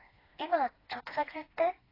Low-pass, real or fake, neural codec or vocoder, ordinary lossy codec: 5.4 kHz; fake; codec, 16 kHz, 2 kbps, FreqCodec, smaller model; AAC, 24 kbps